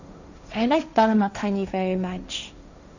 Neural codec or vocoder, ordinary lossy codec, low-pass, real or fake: codec, 16 kHz, 1.1 kbps, Voila-Tokenizer; none; 7.2 kHz; fake